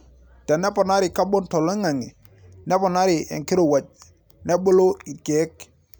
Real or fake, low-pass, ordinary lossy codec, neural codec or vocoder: real; none; none; none